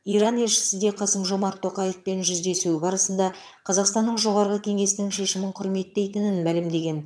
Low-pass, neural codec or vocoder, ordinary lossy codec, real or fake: none; vocoder, 22.05 kHz, 80 mel bands, HiFi-GAN; none; fake